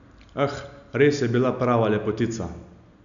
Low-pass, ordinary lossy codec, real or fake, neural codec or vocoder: 7.2 kHz; none; real; none